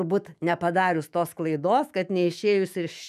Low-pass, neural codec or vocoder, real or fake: 14.4 kHz; autoencoder, 48 kHz, 128 numbers a frame, DAC-VAE, trained on Japanese speech; fake